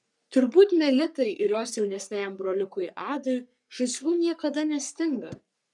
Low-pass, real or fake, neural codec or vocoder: 10.8 kHz; fake; codec, 44.1 kHz, 3.4 kbps, Pupu-Codec